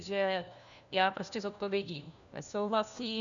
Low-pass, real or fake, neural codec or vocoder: 7.2 kHz; fake; codec, 16 kHz, 1 kbps, FunCodec, trained on LibriTTS, 50 frames a second